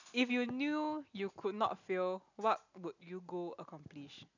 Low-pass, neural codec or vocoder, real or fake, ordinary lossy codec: 7.2 kHz; none; real; none